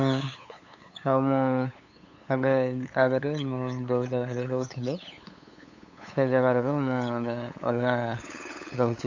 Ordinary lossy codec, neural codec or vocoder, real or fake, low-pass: AAC, 48 kbps; codec, 16 kHz, 8 kbps, FunCodec, trained on LibriTTS, 25 frames a second; fake; 7.2 kHz